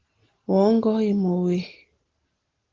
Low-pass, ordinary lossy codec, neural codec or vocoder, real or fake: 7.2 kHz; Opus, 16 kbps; none; real